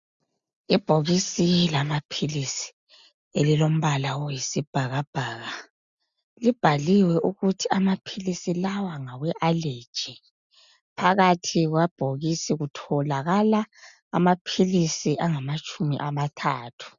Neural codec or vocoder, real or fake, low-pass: none; real; 7.2 kHz